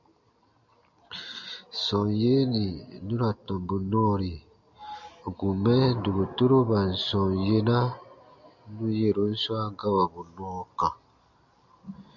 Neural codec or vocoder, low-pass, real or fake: none; 7.2 kHz; real